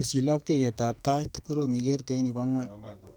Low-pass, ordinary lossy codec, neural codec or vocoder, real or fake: none; none; codec, 44.1 kHz, 2.6 kbps, SNAC; fake